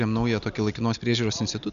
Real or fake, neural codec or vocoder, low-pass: real; none; 7.2 kHz